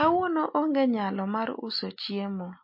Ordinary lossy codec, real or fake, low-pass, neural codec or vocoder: MP3, 32 kbps; real; 5.4 kHz; none